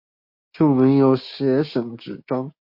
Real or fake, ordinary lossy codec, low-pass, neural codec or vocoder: fake; MP3, 32 kbps; 5.4 kHz; codec, 16 kHz, 6 kbps, DAC